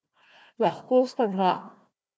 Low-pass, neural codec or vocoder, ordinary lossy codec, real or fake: none; codec, 16 kHz, 1 kbps, FunCodec, trained on Chinese and English, 50 frames a second; none; fake